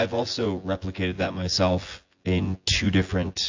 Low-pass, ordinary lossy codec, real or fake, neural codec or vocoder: 7.2 kHz; AAC, 48 kbps; fake; vocoder, 24 kHz, 100 mel bands, Vocos